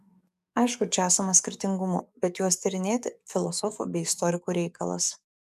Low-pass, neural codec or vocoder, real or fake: 14.4 kHz; codec, 44.1 kHz, 7.8 kbps, DAC; fake